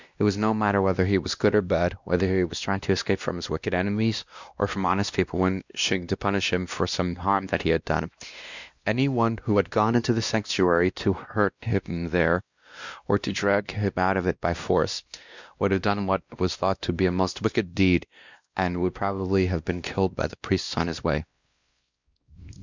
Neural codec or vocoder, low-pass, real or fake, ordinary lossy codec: codec, 16 kHz, 1 kbps, X-Codec, WavLM features, trained on Multilingual LibriSpeech; 7.2 kHz; fake; Opus, 64 kbps